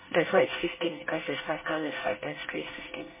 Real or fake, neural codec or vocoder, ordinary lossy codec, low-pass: fake; codec, 24 kHz, 1 kbps, SNAC; MP3, 16 kbps; 3.6 kHz